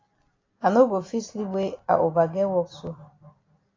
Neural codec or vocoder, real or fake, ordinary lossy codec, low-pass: none; real; AAC, 32 kbps; 7.2 kHz